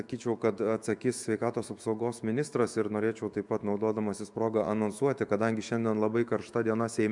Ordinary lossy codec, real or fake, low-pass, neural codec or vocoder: MP3, 96 kbps; fake; 10.8 kHz; autoencoder, 48 kHz, 128 numbers a frame, DAC-VAE, trained on Japanese speech